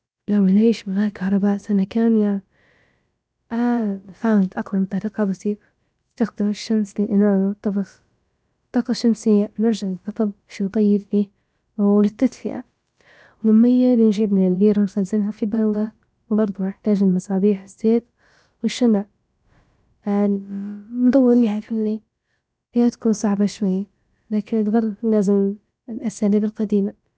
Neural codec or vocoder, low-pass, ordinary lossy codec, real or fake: codec, 16 kHz, about 1 kbps, DyCAST, with the encoder's durations; none; none; fake